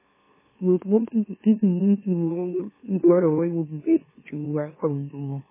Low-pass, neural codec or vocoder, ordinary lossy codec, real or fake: 3.6 kHz; autoencoder, 44.1 kHz, a latent of 192 numbers a frame, MeloTTS; MP3, 16 kbps; fake